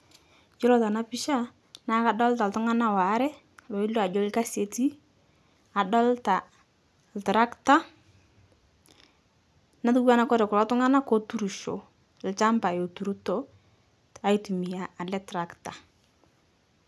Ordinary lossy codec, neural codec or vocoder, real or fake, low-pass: none; none; real; none